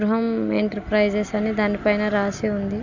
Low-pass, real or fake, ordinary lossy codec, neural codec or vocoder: 7.2 kHz; real; none; none